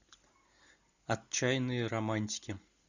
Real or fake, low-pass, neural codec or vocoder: real; 7.2 kHz; none